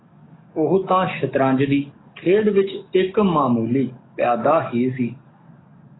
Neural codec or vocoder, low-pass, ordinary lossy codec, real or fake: codec, 44.1 kHz, 7.8 kbps, DAC; 7.2 kHz; AAC, 16 kbps; fake